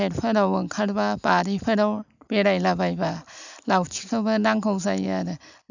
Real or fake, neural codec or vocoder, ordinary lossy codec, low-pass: real; none; none; 7.2 kHz